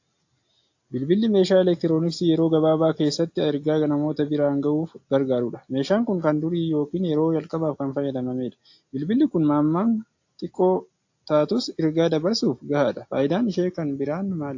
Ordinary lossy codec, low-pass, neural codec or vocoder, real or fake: AAC, 48 kbps; 7.2 kHz; none; real